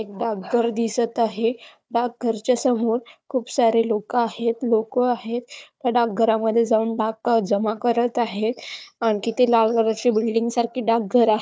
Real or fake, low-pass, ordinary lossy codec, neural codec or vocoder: fake; none; none; codec, 16 kHz, 4 kbps, FunCodec, trained on Chinese and English, 50 frames a second